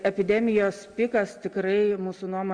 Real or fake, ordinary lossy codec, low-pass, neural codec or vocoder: real; Opus, 64 kbps; 9.9 kHz; none